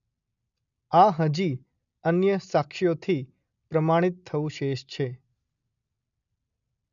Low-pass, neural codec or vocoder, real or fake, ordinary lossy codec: 7.2 kHz; none; real; none